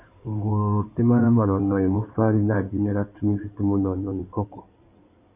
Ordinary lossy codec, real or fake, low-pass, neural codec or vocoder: Opus, 64 kbps; fake; 3.6 kHz; codec, 16 kHz in and 24 kHz out, 2.2 kbps, FireRedTTS-2 codec